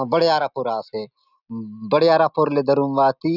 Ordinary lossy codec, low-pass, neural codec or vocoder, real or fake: none; 5.4 kHz; none; real